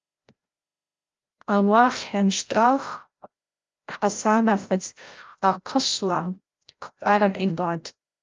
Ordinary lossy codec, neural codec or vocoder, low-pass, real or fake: Opus, 32 kbps; codec, 16 kHz, 0.5 kbps, FreqCodec, larger model; 7.2 kHz; fake